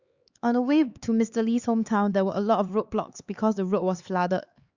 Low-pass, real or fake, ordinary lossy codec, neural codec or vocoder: 7.2 kHz; fake; Opus, 64 kbps; codec, 16 kHz, 4 kbps, X-Codec, HuBERT features, trained on LibriSpeech